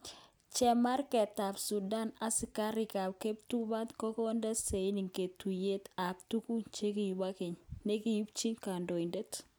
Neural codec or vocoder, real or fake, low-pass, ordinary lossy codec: none; real; none; none